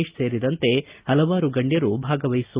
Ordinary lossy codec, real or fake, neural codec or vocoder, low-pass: Opus, 32 kbps; real; none; 3.6 kHz